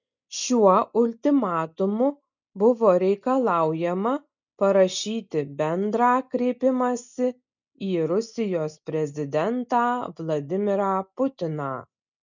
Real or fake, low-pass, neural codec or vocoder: real; 7.2 kHz; none